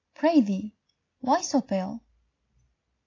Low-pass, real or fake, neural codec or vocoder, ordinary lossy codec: 7.2 kHz; real; none; AAC, 32 kbps